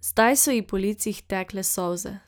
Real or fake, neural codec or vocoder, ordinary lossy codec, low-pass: real; none; none; none